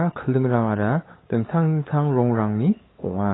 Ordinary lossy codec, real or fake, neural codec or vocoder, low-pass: AAC, 16 kbps; fake; codec, 16 kHz, 8 kbps, FunCodec, trained on LibriTTS, 25 frames a second; 7.2 kHz